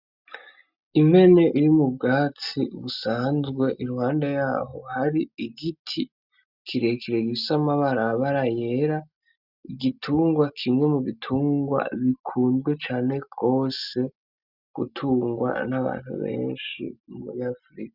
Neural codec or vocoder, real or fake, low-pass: none; real; 5.4 kHz